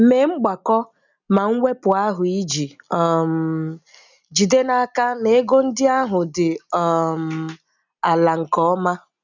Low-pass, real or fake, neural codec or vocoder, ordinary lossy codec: 7.2 kHz; real; none; none